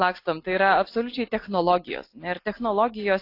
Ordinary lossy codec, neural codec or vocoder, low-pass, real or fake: AAC, 32 kbps; none; 5.4 kHz; real